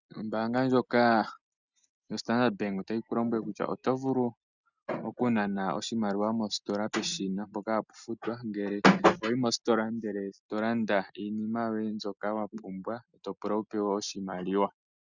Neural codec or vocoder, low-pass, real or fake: none; 7.2 kHz; real